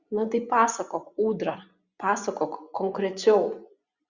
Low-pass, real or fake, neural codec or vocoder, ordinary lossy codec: 7.2 kHz; fake; vocoder, 24 kHz, 100 mel bands, Vocos; Opus, 64 kbps